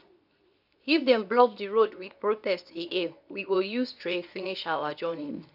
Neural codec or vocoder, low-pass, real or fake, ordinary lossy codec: codec, 24 kHz, 0.9 kbps, WavTokenizer, medium speech release version 2; 5.4 kHz; fake; none